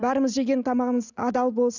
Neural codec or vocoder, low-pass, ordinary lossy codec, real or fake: none; 7.2 kHz; none; real